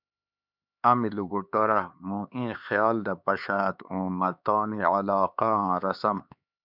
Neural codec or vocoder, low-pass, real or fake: codec, 16 kHz, 4 kbps, X-Codec, HuBERT features, trained on LibriSpeech; 5.4 kHz; fake